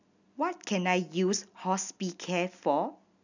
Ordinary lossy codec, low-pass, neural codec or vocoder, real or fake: none; 7.2 kHz; none; real